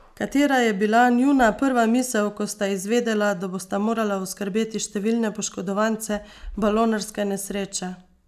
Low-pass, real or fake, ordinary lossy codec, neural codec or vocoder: 14.4 kHz; real; none; none